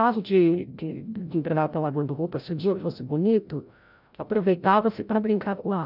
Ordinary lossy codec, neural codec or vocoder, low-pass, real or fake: none; codec, 16 kHz, 0.5 kbps, FreqCodec, larger model; 5.4 kHz; fake